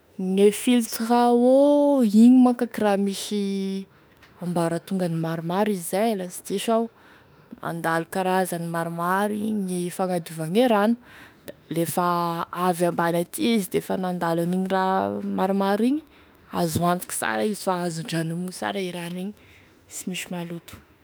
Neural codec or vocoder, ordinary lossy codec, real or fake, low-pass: autoencoder, 48 kHz, 32 numbers a frame, DAC-VAE, trained on Japanese speech; none; fake; none